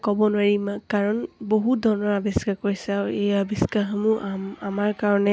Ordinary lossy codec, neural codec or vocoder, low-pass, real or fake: none; none; none; real